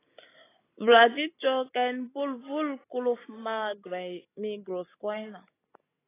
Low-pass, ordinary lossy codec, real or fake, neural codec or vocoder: 3.6 kHz; AAC, 16 kbps; real; none